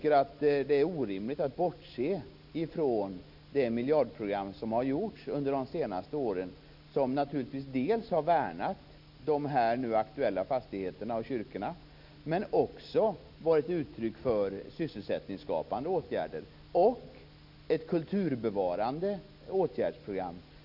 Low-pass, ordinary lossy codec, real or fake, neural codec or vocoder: 5.4 kHz; none; real; none